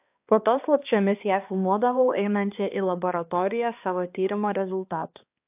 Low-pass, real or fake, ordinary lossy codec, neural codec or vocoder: 3.6 kHz; fake; AAC, 32 kbps; codec, 16 kHz, 2 kbps, X-Codec, HuBERT features, trained on balanced general audio